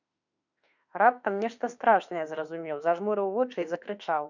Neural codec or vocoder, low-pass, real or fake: autoencoder, 48 kHz, 32 numbers a frame, DAC-VAE, trained on Japanese speech; 7.2 kHz; fake